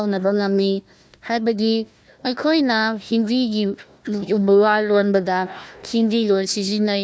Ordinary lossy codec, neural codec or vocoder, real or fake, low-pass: none; codec, 16 kHz, 1 kbps, FunCodec, trained on Chinese and English, 50 frames a second; fake; none